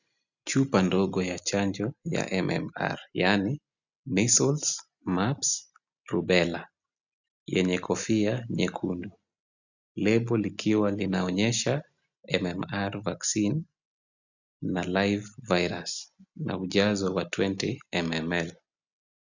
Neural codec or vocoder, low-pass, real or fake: none; 7.2 kHz; real